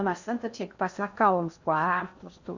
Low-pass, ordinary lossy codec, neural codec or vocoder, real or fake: 7.2 kHz; Opus, 64 kbps; codec, 16 kHz in and 24 kHz out, 0.6 kbps, FocalCodec, streaming, 2048 codes; fake